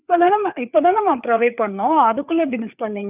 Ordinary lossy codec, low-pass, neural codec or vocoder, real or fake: none; 3.6 kHz; codec, 16 kHz, 4 kbps, FreqCodec, larger model; fake